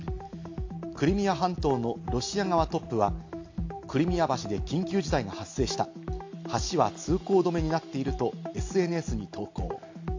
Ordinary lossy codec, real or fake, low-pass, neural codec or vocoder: AAC, 48 kbps; real; 7.2 kHz; none